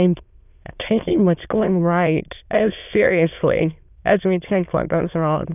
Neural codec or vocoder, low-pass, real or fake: autoencoder, 22.05 kHz, a latent of 192 numbers a frame, VITS, trained on many speakers; 3.6 kHz; fake